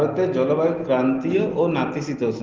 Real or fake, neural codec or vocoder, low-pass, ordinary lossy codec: real; none; 7.2 kHz; Opus, 16 kbps